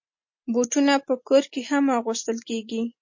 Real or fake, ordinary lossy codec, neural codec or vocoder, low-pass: real; MP3, 32 kbps; none; 7.2 kHz